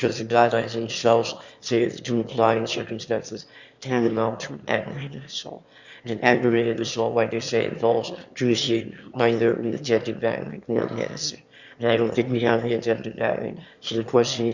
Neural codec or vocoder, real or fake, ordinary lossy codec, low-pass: autoencoder, 22.05 kHz, a latent of 192 numbers a frame, VITS, trained on one speaker; fake; Opus, 64 kbps; 7.2 kHz